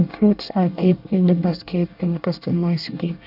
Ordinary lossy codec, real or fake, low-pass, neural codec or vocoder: none; fake; 5.4 kHz; codec, 24 kHz, 1 kbps, SNAC